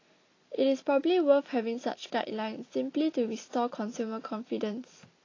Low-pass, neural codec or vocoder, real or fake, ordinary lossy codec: 7.2 kHz; none; real; AAC, 32 kbps